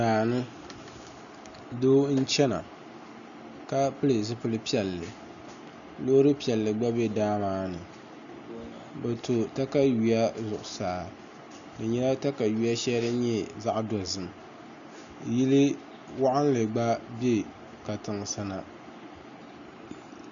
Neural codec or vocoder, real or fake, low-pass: none; real; 7.2 kHz